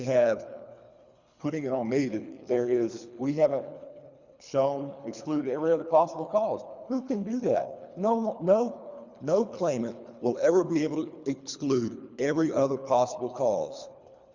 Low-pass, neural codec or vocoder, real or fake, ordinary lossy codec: 7.2 kHz; codec, 24 kHz, 3 kbps, HILCodec; fake; Opus, 64 kbps